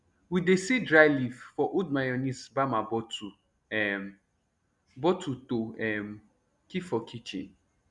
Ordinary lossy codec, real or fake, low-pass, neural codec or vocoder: none; real; 10.8 kHz; none